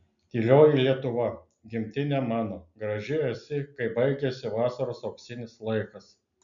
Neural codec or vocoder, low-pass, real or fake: none; 7.2 kHz; real